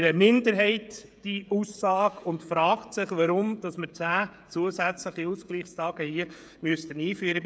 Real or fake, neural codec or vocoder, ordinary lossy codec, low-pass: fake; codec, 16 kHz, 16 kbps, FreqCodec, smaller model; none; none